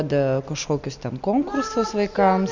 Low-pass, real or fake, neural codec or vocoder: 7.2 kHz; real; none